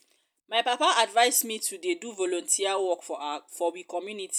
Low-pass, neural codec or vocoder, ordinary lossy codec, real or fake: none; none; none; real